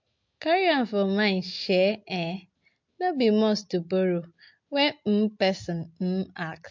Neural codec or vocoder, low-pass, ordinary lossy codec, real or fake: none; 7.2 kHz; MP3, 48 kbps; real